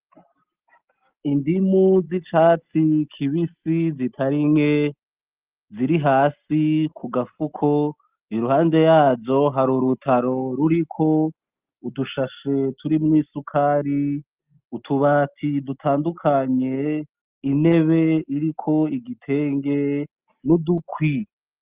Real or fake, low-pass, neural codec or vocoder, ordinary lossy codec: real; 3.6 kHz; none; Opus, 32 kbps